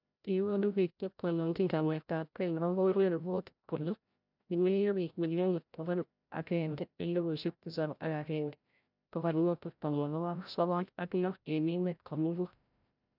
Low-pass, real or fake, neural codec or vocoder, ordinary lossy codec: 5.4 kHz; fake; codec, 16 kHz, 0.5 kbps, FreqCodec, larger model; none